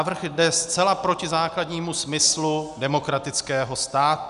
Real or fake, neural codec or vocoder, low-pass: real; none; 10.8 kHz